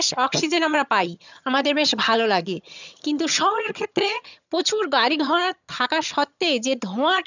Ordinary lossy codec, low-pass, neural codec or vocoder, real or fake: none; 7.2 kHz; vocoder, 22.05 kHz, 80 mel bands, HiFi-GAN; fake